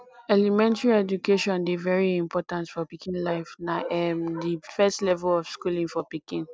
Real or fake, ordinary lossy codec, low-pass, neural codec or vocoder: real; none; none; none